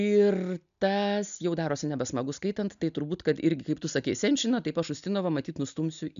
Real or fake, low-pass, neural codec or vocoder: real; 7.2 kHz; none